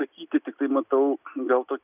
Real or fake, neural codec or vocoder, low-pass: real; none; 3.6 kHz